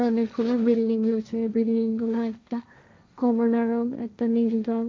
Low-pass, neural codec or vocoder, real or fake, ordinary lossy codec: none; codec, 16 kHz, 1.1 kbps, Voila-Tokenizer; fake; none